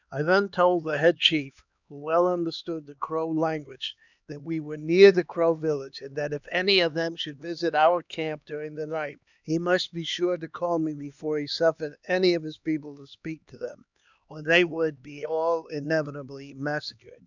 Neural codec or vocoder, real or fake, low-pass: codec, 16 kHz, 2 kbps, X-Codec, HuBERT features, trained on LibriSpeech; fake; 7.2 kHz